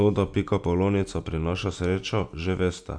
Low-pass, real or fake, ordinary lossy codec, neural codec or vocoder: 9.9 kHz; real; AAC, 64 kbps; none